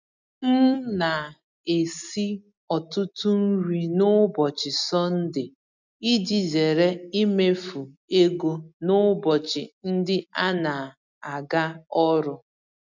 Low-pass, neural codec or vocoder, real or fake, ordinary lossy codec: 7.2 kHz; none; real; none